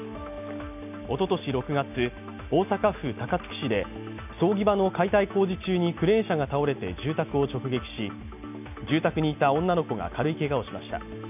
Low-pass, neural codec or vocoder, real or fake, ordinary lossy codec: 3.6 kHz; none; real; none